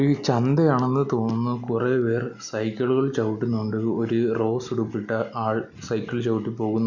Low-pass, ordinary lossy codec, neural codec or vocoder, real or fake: 7.2 kHz; none; none; real